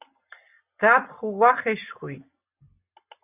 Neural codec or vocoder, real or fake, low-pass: none; real; 3.6 kHz